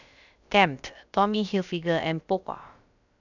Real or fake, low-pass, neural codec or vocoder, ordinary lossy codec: fake; 7.2 kHz; codec, 16 kHz, about 1 kbps, DyCAST, with the encoder's durations; none